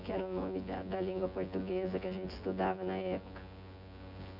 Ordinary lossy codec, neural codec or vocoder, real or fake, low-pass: none; vocoder, 24 kHz, 100 mel bands, Vocos; fake; 5.4 kHz